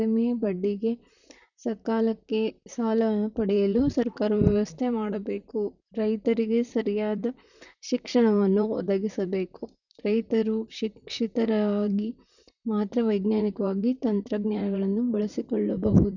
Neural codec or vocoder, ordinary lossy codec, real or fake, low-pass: vocoder, 44.1 kHz, 128 mel bands, Pupu-Vocoder; none; fake; 7.2 kHz